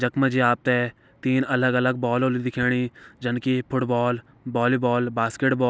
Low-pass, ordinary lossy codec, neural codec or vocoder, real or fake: none; none; none; real